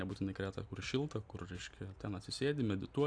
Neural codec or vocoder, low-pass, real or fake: none; 9.9 kHz; real